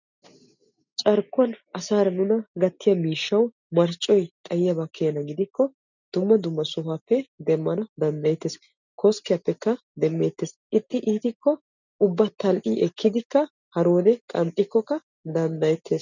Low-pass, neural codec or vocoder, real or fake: 7.2 kHz; none; real